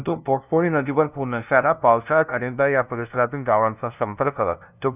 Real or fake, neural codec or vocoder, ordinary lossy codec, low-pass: fake; codec, 16 kHz, 0.5 kbps, FunCodec, trained on LibriTTS, 25 frames a second; none; 3.6 kHz